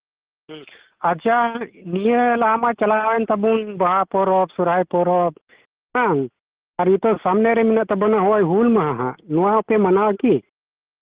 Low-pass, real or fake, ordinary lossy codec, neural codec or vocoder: 3.6 kHz; real; Opus, 16 kbps; none